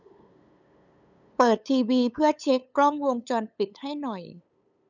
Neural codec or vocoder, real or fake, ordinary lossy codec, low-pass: codec, 16 kHz, 8 kbps, FunCodec, trained on LibriTTS, 25 frames a second; fake; none; 7.2 kHz